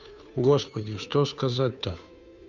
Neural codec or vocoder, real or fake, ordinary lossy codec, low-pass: codec, 16 kHz, 2 kbps, FunCodec, trained on Chinese and English, 25 frames a second; fake; none; 7.2 kHz